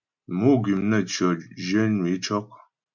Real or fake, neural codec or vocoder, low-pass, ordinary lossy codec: real; none; 7.2 kHz; MP3, 64 kbps